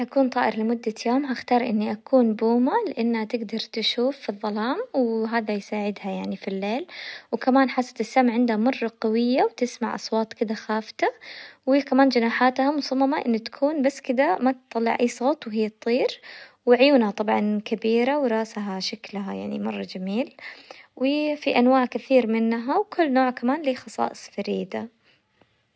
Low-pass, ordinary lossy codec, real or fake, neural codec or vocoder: none; none; real; none